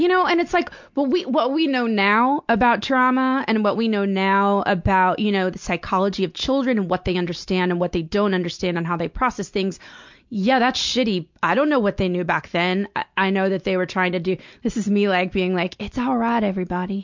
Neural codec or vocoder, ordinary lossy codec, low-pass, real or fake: none; MP3, 64 kbps; 7.2 kHz; real